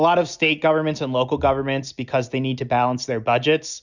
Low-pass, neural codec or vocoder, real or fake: 7.2 kHz; none; real